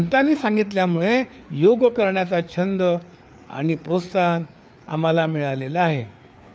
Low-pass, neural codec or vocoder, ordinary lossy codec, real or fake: none; codec, 16 kHz, 4 kbps, FreqCodec, larger model; none; fake